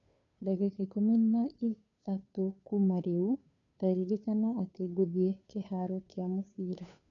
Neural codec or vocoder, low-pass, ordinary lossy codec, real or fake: codec, 16 kHz, 2 kbps, FunCodec, trained on Chinese and English, 25 frames a second; 7.2 kHz; MP3, 96 kbps; fake